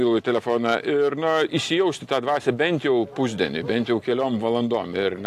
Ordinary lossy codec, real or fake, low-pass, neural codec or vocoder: AAC, 64 kbps; real; 14.4 kHz; none